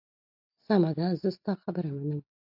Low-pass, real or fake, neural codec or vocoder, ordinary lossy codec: 5.4 kHz; real; none; AAC, 48 kbps